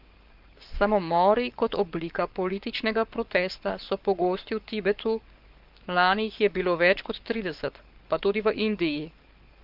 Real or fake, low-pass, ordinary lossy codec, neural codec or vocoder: fake; 5.4 kHz; Opus, 24 kbps; codec, 44.1 kHz, 7.8 kbps, Pupu-Codec